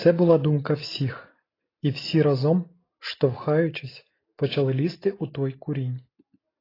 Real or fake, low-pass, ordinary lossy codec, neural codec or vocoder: real; 5.4 kHz; AAC, 24 kbps; none